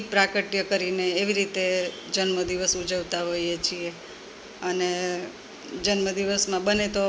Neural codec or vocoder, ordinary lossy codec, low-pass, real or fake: none; none; none; real